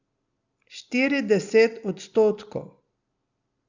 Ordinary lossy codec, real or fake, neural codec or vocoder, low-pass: Opus, 64 kbps; real; none; 7.2 kHz